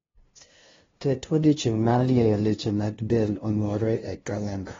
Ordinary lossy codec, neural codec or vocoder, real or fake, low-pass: AAC, 24 kbps; codec, 16 kHz, 0.5 kbps, FunCodec, trained on LibriTTS, 25 frames a second; fake; 7.2 kHz